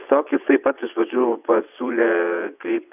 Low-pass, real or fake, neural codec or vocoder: 3.6 kHz; fake; vocoder, 22.05 kHz, 80 mel bands, WaveNeXt